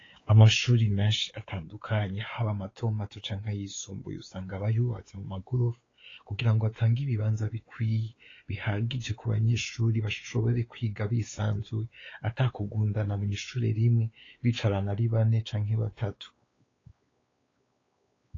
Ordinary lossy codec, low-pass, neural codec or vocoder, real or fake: AAC, 32 kbps; 7.2 kHz; codec, 16 kHz, 4 kbps, X-Codec, WavLM features, trained on Multilingual LibriSpeech; fake